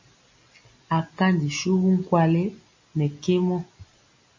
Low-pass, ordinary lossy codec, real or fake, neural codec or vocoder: 7.2 kHz; MP3, 32 kbps; real; none